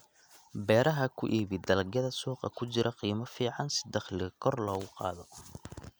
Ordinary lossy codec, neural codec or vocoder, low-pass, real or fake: none; none; none; real